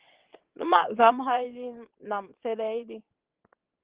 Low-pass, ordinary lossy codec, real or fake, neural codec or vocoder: 3.6 kHz; Opus, 16 kbps; fake; vocoder, 22.05 kHz, 80 mel bands, WaveNeXt